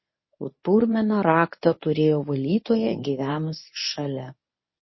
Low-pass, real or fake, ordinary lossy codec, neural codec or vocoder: 7.2 kHz; fake; MP3, 24 kbps; codec, 24 kHz, 0.9 kbps, WavTokenizer, medium speech release version 1